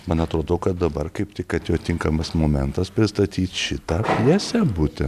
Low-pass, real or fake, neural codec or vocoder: 14.4 kHz; real; none